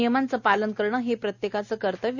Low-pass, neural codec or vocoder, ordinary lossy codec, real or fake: 7.2 kHz; none; none; real